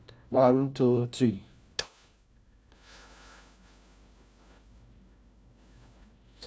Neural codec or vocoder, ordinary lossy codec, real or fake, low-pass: codec, 16 kHz, 1 kbps, FunCodec, trained on LibriTTS, 50 frames a second; none; fake; none